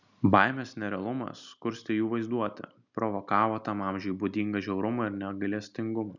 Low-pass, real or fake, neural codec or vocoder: 7.2 kHz; real; none